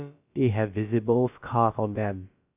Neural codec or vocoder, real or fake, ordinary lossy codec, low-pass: codec, 16 kHz, about 1 kbps, DyCAST, with the encoder's durations; fake; none; 3.6 kHz